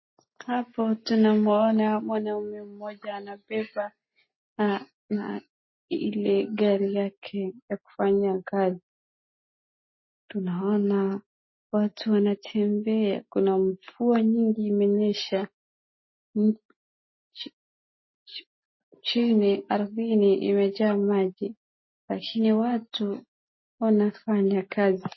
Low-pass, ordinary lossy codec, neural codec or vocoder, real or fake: 7.2 kHz; MP3, 24 kbps; none; real